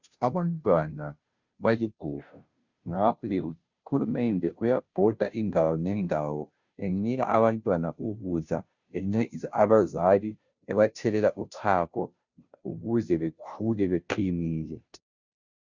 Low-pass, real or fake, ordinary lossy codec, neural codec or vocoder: 7.2 kHz; fake; Opus, 64 kbps; codec, 16 kHz, 0.5 kbps, FunCodec, trained on Chinese and English, 25 frames a second